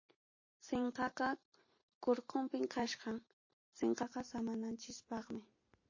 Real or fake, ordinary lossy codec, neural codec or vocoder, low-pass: fake; MP3, 32 kbps; vocoder, 44.1 kHz, 128 mel bands every 512 samples, BigVGAN v2; 7.2 kHz